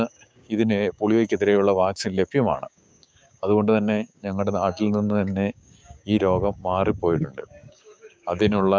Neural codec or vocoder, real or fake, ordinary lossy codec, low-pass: codec, 16 kHz, 6 kbps, DAC; fake; none; none